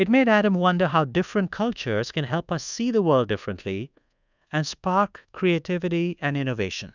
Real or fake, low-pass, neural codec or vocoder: fake; 7.2 kHz; codec, 24 kHz, 1.2 kbps, DualCodec